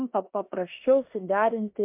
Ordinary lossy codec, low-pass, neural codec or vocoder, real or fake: MP3, 32 kbps; 3.6 kHz; codec, 16 kHz in and 24 kHz out, 0.9 kbps, LongCat-Audio-Codec, four codebook decoder; fake